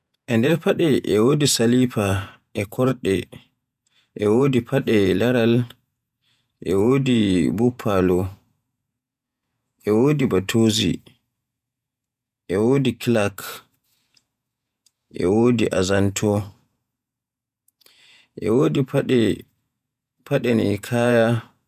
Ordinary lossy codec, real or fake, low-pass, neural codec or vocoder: none; fake; 14.4 kHz; vocoder, 48 kHz, 128 mel bands, Vocos